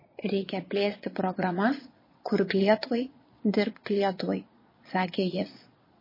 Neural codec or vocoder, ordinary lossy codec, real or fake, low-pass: vocoder, 22.05 kHz, 80 mel bands, Vocos; MP3, 24 kbps; fake; 5.4 kHz